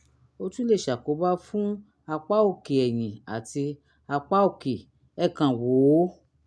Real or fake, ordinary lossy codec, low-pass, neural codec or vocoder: real; none; none; none